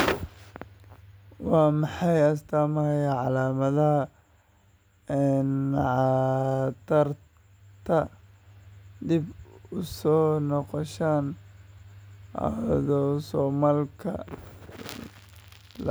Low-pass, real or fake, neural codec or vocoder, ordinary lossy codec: none; real; none; none